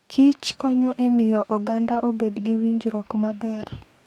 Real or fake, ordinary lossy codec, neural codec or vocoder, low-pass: fake; none; codec, 44.1 kHz, 2.6 kbps, DAC; 19.8 kHz